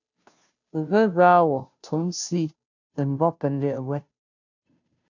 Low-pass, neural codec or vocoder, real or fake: 7.2 kHz; codec, 16 kHz, 0.5 kbps, FunCodec, trained on Chinese and English, 25 frames a second; fake